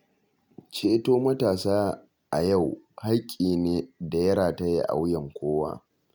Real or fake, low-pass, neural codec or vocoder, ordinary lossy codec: real; none; none; none